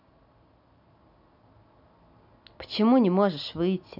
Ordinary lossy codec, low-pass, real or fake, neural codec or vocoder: none; 5.4 kHz; real; none